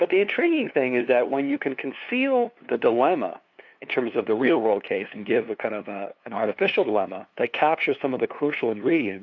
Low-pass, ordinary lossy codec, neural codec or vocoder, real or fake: 7.2 kHz; AAC, 48 kbps; codec, 16 kHz, 2 kbps, FunCodec, trained on LibriTTS, 25 frames a second; fake